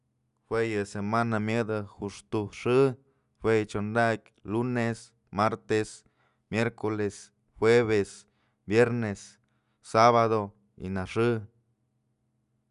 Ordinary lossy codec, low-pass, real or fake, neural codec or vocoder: none; 10.8 kHz; real; none